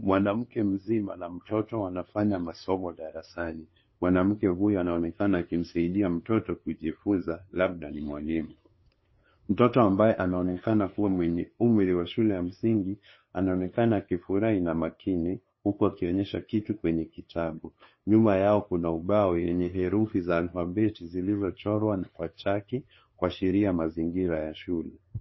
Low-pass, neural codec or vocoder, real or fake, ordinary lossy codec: 7.2 kHz; codec, 16 kHz, 2 kbps, FunCodec, trained on LibriTTS, 25 frames a second; fake; MP3, 24 kbps